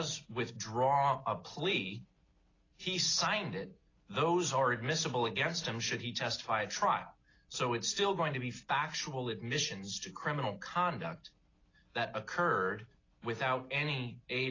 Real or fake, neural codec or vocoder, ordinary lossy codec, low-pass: real; none; AAC, 32 kbps; 7.2 kHz